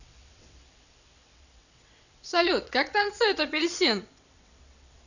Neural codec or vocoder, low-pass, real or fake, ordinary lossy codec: vocoder, 22.05 kHz, 80 mel bands, WaveNeXt; 7.2 kHz; fake; none